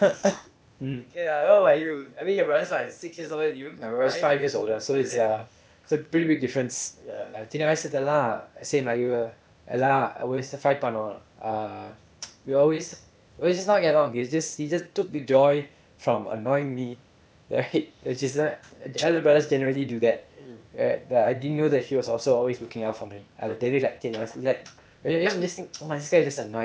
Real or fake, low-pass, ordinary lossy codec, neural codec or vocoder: fake; none; none; codec, 16 kHz, 0.8 kbps, ZipCodec